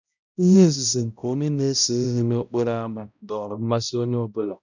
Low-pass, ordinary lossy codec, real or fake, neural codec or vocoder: 7.2 kHz; none; fake; codec, 16 kHz, 0.5 kbps, X-Codec, HuBERT features, trained on balanced general audio